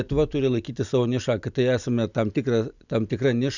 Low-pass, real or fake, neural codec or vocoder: 7.2 kHz; real; none